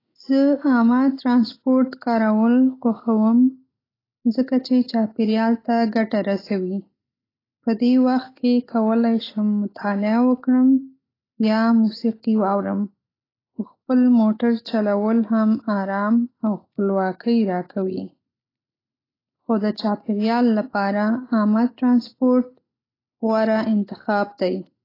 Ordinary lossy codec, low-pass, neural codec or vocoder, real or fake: AAC, 24 kbps; 5.4 kHz; none; real